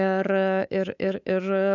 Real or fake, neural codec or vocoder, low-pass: fake; codec, 24 kHz, 3.1 kbps, DualCodec; 7.2 kHz